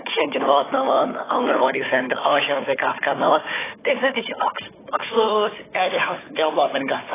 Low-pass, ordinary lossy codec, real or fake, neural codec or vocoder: 3.6 kHz; AAC, 16 kbps; fake; codec, 16 kHz, 4 kbps, FunCodec, trained on Chinese and English, 50 frames a second